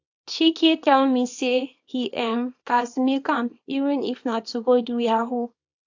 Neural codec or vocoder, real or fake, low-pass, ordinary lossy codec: codec, 24 kHz, 0.9 kbps, WavTokenizer, small release; fake; 7.2 kHz; AAC, 48 kbps